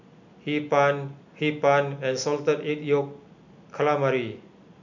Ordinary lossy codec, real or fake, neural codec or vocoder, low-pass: none; real; none; 7.2 kHz